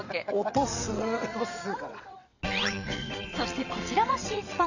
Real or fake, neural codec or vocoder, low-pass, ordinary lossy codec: fake; vocoder, 22.05 kHz, 80 mel bands, WaveNeXt; 7.2 kHz; none